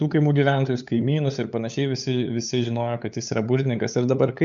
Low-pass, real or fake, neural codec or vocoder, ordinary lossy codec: 7.2 kHz; fake; codec, 16 kHz, 8 kbps, FunCodec, trained on LibriTTS, 25 frames a second; MP3, 64 kbps